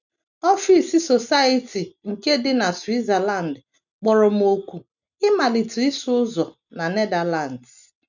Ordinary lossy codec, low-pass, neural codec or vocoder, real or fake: none; 7.2 kHz; none; real